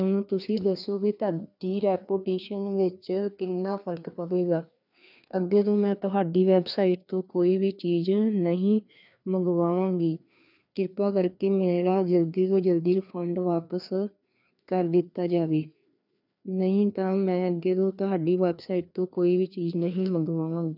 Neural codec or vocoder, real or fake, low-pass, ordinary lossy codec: codec, 16 kHz, 2 kbps, FreqCodec, larger model; fake; 5.4 kHz; none